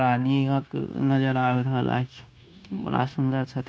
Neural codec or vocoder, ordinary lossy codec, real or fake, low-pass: codec, 16 kHz, 0.9 kbps, LongCat-Audio-Codec; none; fake; none